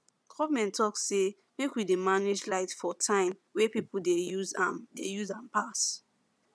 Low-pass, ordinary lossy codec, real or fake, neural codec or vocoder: none; none; fake; vocoder, 22.05 kHz, 80 mel bands, Vocos